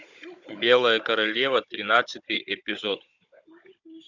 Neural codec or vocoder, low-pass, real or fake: codec, 16 kHz, 16 kbps, FunCodec, trained on Chinese and English, 50 frames a second; 7.2 kHz; fake